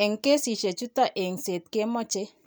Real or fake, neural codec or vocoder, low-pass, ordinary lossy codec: real; none; none; none